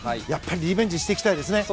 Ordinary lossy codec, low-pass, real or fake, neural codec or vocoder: none; none; real; none